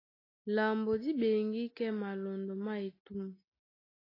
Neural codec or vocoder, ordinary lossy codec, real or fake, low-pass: none; AAC, 24 kbps; real; 5.4 kHz